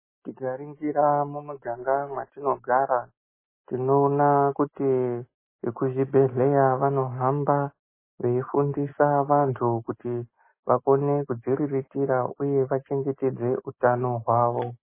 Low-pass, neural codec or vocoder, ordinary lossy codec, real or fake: 3.6 kHz; none; MP3, 16 kbps; real